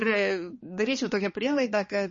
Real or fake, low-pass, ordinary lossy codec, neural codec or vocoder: fake; 7.2 kHz; MP3, 32 kbps; codec, 16 kHz, 2 kbps, X-Codec, HuBERT features, trained on balanced general audio